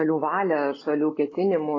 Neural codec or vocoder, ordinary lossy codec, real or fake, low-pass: none; AAC, 32 kbps; real; 7.2 kHz